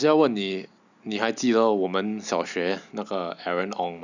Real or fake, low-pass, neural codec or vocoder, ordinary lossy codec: real; 7.2 kHz; none; none